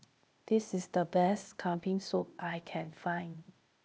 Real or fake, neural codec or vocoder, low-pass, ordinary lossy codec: fake; codec, 16 kHz, 0.8 kbps, ZipCodec; none; none